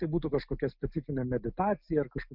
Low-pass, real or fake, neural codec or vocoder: 5.4 kHz; real; none